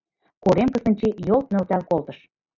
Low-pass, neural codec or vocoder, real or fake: 7.2 kHz; none; real